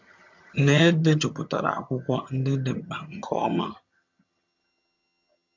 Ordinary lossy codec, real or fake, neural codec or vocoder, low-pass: MP3, 64 kbps; fake; vocoder, 22.05 kHz, 80 mel bands, HiFi-GAN; 7.2 kHz